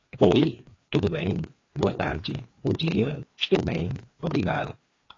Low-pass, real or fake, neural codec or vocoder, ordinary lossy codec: 7.2 kHz; fake; codec, 16 kHz, 2 kbps, FreqCodec, larger model; AAC, 32 kbps